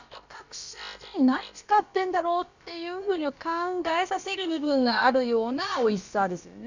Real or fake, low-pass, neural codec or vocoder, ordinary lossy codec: fake; 7.2 kHz; codec, 16 kHz, about 1 kbps, DyCAST, with the encoder's durations; Opus, 64 kbps